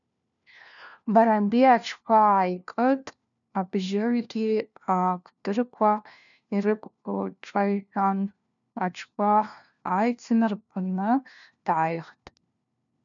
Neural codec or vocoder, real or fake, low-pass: codec, 16 kHz, 1 kbps, FunCodec, trained on LibriTTS, 50 frames a second; fake; 7.2 kHz